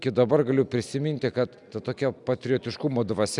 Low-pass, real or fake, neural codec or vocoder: 10.8 kHz; real; none